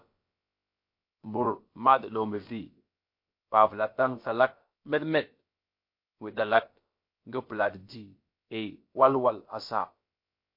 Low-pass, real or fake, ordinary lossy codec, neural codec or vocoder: 5.4 kHz; fake; MP3, 32 kbps; codec, 16 kHz, about 1 kbps, DyCAST, with the encoder's durations